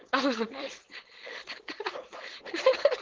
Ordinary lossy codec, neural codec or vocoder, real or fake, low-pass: Opus, 16 kbps; codec, 16 kHz, 4.8 kbps, FACodec; fake; 7.2 kHz